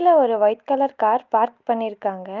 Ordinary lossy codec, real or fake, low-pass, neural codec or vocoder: Opus, 24 kbps; real; 7.2 kHz; none